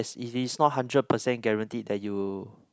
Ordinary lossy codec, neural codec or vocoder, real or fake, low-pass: none; none; real; none